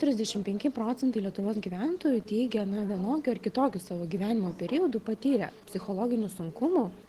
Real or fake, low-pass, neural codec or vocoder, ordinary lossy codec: real; 14.4 kHz; none; Opus, 16 kbps